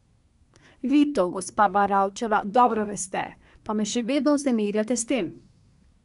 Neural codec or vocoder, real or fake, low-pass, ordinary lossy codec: codec, 24 kHz, 1 kbps, SNAC; fake; 10.8 kHz; none